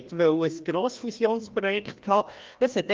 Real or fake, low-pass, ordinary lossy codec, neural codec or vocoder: fake; 7.2 kHz; Opus, 24 kbps; codec, 16 kHz, 1 kbps, FreqCodec, larger model